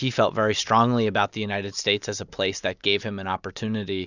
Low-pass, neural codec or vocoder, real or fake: 7.2 kHz; none; real